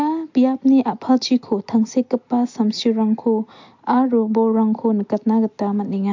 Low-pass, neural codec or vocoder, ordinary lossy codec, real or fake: 7.2 kHz; none; MP3, 48 kbps; real